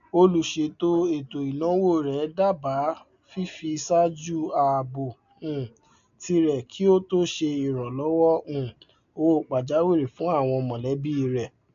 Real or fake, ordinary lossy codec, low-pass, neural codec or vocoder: real; AAC, 96 kbps; 7.2 kHz; none